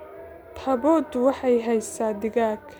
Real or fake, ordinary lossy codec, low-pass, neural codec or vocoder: real; none; none; none